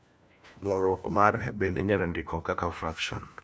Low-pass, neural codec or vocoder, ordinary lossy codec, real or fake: none; codec, 16 kHz, 1 kbps, FunCodec, trained on LibriTTS, 50 frames a second; none; fake